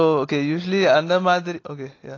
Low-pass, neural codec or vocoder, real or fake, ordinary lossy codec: 7.2 kHz; none; real; AAC, 32 kbps